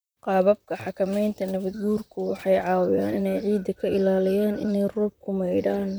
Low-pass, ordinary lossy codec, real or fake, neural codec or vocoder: none; none; fake; vocoder, 44.1 kHz, 128 mel bands, Pupu-Vocoder